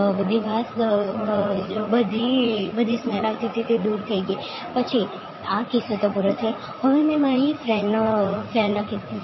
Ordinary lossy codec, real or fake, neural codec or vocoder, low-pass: MP3, 24 kbps; fake; vocoder, 44.1 kHz, 80 mel bands, Vocos; 7.2 kHz